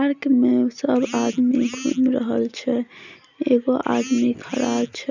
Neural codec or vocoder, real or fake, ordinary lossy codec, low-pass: none; real; none; 7.2 kHz